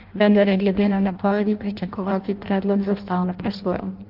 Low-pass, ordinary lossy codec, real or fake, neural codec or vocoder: 5.4 kHz; Opus, 24 kbps; fake; codec, 16 kHz in and 24 kHz out, 0.6 kbps, FireRedTTS-2 codec